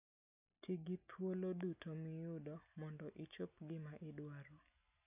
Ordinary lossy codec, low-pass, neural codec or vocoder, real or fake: none; 3.6 kHz; none; real